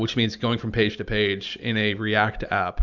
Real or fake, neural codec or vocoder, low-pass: real; none; 7.2 kHz